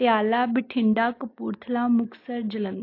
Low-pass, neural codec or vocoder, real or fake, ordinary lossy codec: 5.4 kHz; none; real; AAC, 32 kbps